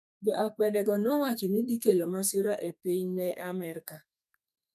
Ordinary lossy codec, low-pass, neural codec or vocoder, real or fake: none; 14.4 kHz; codec, 32 kHz, 1.9 kbps, SNAC; fake